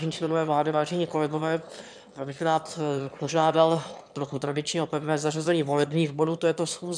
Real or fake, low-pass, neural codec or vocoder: fake; 9.9 kHz; autoencoder, 22.05 kHz, a latent of 192 numbers a frame, VITS, trained on one speaker